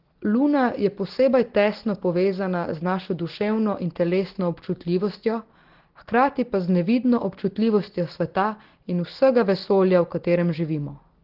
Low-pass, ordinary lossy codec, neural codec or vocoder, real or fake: 5.4 kHz; Opus, 16 kbps; none; real